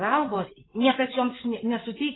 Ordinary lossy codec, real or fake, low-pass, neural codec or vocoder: AAC, 16 kbps; fake; 7.2 kHz; codec, 16 kHz in and 24 kHz out, 2.2 kbps, FireRedTTS-2 codec